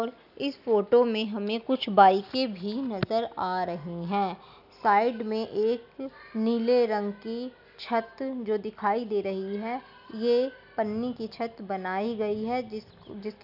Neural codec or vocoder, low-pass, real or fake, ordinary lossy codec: none; 5.4 kHz; real; none